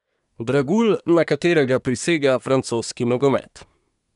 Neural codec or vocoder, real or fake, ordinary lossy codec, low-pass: codec, 24 kHz, 1 kbps, SNAC; fake; none; 10.8 kHz